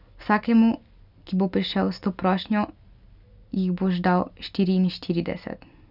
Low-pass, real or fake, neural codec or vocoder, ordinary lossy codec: 5.4 kHz; real; none; none